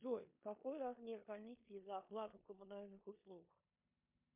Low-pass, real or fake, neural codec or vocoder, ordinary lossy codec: 3.6 kHz; fake; codec, 16 kHz in and 24 kHz out, 0.9 kbps, LongCat-Audio-Codec, four codebook decoder; MP3, 32 kbps